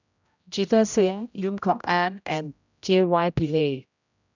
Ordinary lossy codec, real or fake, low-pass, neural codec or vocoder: none; fake; 7.2 kHz; codec, 16 kHz, 0.5 kbps, X-Codec, HuBERT features, trained on general audio